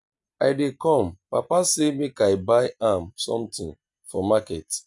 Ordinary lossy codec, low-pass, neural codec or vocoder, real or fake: none; 10.8 kHz; none; real